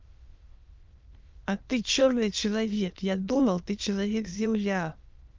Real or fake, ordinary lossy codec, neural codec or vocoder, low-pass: fake; Opus, 24 kbps; autoencoder, 22.05 kHz, a latent of 192 numbers a frame, VITS, trained on many speakers; 7.2 kHz